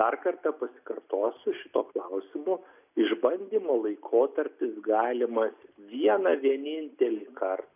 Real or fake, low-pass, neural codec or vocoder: real; 3.6 kHz; none